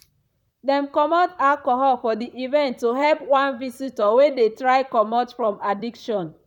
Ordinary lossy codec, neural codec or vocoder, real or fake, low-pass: none; vocoder, 44.1 kHz, 128 mel bands, Pupu-Vocoder; fake; 19.8 kHz